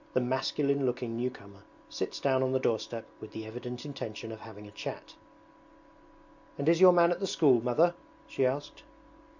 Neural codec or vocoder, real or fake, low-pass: none; real; 7.2 kHz